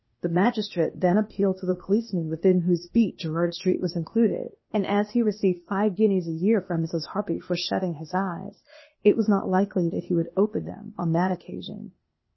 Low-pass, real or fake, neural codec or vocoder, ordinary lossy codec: 7.2 kHz; fake; codec, 16 kHz, 0.8 kbps, ZipCodec; MP3, 24 kbps